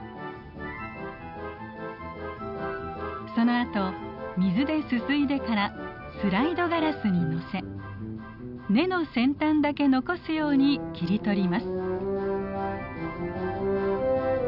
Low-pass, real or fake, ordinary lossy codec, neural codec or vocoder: 5.4 kHz; real; none; none